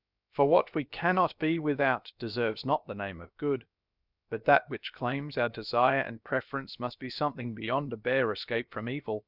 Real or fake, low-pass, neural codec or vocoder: fake; 5.4 kHz; codec, 16 kHz, about 1 kbps, DyCAST, with the encoder's durations